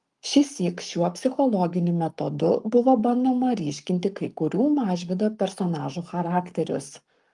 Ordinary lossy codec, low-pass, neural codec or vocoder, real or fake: Opus, 32 kbps; 10.8 kHz; codec, 44.1 kHz, 7.8 kbps, Pupu-Codec; fake